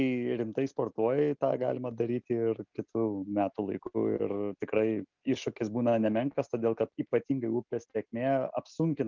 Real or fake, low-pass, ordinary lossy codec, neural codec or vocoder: real; 7.2 kHz; Opus, 24 kbps; none